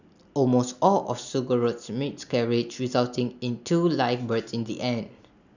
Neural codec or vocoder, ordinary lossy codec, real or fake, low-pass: none; none; real; 7.2 kHz